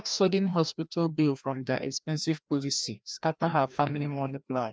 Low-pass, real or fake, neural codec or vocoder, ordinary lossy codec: none; fake; codec, 16 kHz, 1 kbps, FreqCodec, larger model; none